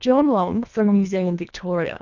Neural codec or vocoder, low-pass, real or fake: codec, 24 kHz, 1.5 kbps, HILCodec; 7.2 kHz; fake